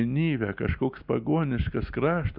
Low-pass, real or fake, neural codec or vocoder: 5.4 kHz; real; none